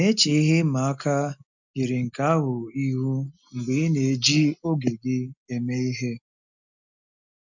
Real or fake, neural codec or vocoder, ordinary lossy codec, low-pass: real; none; none; 7.2 kHz